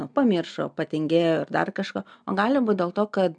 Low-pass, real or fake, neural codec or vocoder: 9.9 kHz; real; none